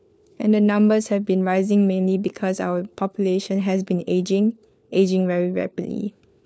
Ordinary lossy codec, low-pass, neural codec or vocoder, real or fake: none; none; codec, 16 kHz, 4 kbps, FunCodec, trained on LibriTTS, 50 frames a second; fake